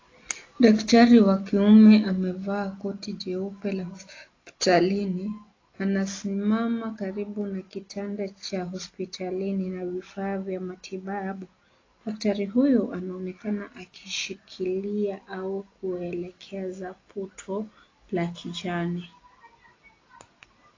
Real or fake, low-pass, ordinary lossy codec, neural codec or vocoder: real; 7.2 kHz; AAC, 32 kbps; none